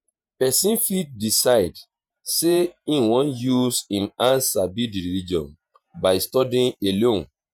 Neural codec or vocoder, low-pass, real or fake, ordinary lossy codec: vocoder, 48 kHz, 128 mel bands, Vocos; none; fake; none